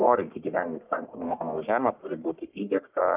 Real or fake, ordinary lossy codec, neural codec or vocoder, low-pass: fake; Opus, 24 kbps; codec, 44.1 kHz, 1.7 kbps, Pupu-Codec; 3.6 kHz